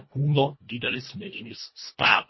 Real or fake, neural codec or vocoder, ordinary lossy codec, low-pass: fake; codec, 24 kHz, 1.5 kbps, HILCodec; MP3, 24 kbps; 7.2 kHz